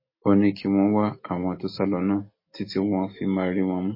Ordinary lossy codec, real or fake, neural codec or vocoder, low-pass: MP3, 24 kbps; real; none; 5.4 kHz